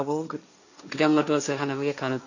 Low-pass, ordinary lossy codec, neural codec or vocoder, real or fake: 7.2 kHz; none; codec, 16 kHz, 1.1 kbps, Voila-Tokenizer; fake